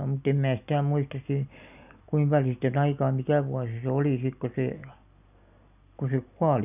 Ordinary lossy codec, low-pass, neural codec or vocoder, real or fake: none; 3.6 kHz; none; real